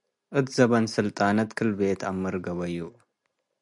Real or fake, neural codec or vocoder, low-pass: real; none; 10.8 kHz